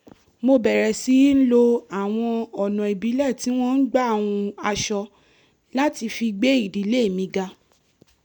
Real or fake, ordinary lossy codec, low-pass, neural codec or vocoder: real; none; 19.8 kHz; none